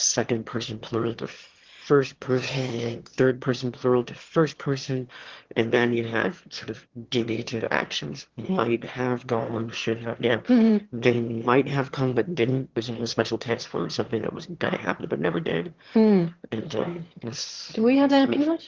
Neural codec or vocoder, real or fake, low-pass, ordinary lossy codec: autoencoder, 22.05 kHz, a latent of 192 numbers a frame, VITS, trained on one speaker; fake; 7.2 kHz; Opus, 16 kbps